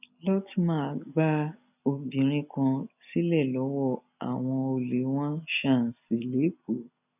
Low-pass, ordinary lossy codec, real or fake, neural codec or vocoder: 3.6 kHz; none; real; none